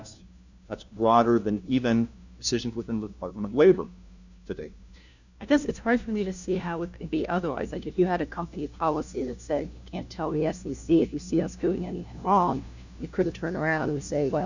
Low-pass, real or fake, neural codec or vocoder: 7.2 kHz; fake; codec, 16 kHz, 1 kbps, FunCodec, trained on LibriTTS, 50 frames a second